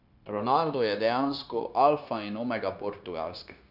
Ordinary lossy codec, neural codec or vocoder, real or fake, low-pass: none; codec, 16 kHz, 0.9 kbps, LongCat-Audio-Codec; fake; 5.4 kHz